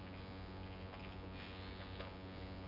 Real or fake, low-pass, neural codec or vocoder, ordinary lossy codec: fake; 5.4 kHz; vocoder, 24 kHz, 100 mel bands, Vocos; none